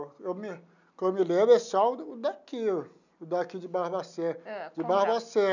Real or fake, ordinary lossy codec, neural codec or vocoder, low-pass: real; none; none; 7.2 kHz